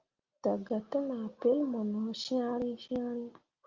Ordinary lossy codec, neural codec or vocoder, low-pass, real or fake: Opus, 32 kbps; none; 7.2 kHz; real